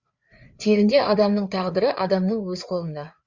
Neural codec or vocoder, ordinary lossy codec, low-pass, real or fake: codec, 16 kHz, 4 kbps, FreqCodec, larger model; Opus, 64 kbps; 7.2 kHz; fake